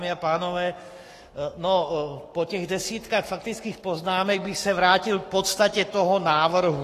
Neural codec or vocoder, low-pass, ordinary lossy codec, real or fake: codec, 44.1 kHz, 7.8 kbps, Pupu-Codec; 14.4 kHz; AAC, 48 kbps; fake